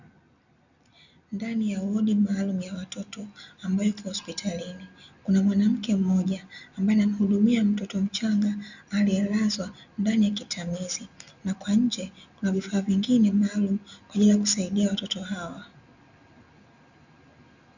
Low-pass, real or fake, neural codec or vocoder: 7.2 kHz; real; none